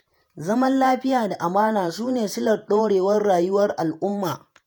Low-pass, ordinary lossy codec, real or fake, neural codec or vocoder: none; none; fake; vocoder, 48 kHz, 128 mel bands, Vocos